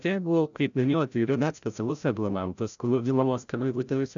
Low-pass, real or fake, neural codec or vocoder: 7.2 kHz; fake; codec, 16 kHz, 0.5 kbps, FreqCodec, larger model